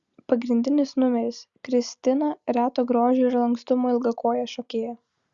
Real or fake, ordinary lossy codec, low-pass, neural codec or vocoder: real; Opus, 64 kbps; 7.2 kHz; none